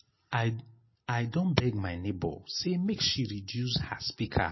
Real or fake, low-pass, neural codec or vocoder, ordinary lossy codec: real; 7.2 kHz; none; MP3, 24 kbps